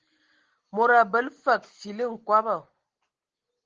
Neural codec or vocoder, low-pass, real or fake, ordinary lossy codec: none; 7.2 kHz; real; Opus, 16 kbps